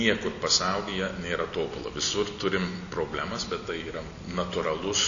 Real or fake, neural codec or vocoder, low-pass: real; none; 7.2 kHz